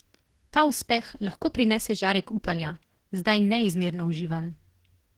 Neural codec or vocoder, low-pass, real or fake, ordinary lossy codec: codec, 44.1 kHz, 2.6 kbps, DAC; 19.8 kHz; fake; Opus, 16 kbps